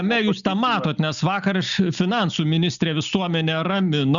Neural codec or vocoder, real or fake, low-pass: none; real; 7.2 kHz